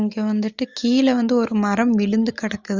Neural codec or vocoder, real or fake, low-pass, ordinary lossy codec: none; real; 7.2 kHz; Opus, 32 kbps